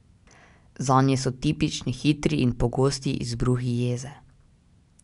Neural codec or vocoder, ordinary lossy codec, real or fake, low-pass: none; none; real; 10.8 kHz